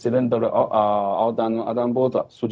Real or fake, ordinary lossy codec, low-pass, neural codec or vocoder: fake; none; none; codec, 16 kHz, 0.4 kbps, LongCat-Audio-Codec